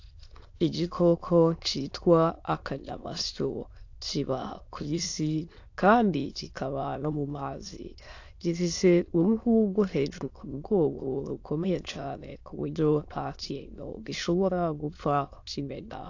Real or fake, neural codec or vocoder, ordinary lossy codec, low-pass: fake; autoencoder, 22.05 kHz, a latent of 192 numbers a frame, VITS, trained on many speakers; AAC, 48 kbps; 7.2 kHz